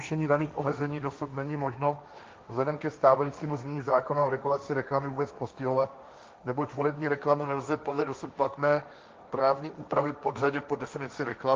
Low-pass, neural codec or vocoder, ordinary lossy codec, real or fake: 7.2 kHz; codec, 16 kHz, 1.1 kbps, Voila-Tokenizer; Opus, 24 kbps; fake